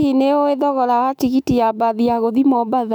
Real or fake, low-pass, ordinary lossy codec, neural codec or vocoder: fake; 19.8 kHz; none; autoencoder, 48 kHz, 128 numbers a frame, DAC-VAE, trained on Japanese speech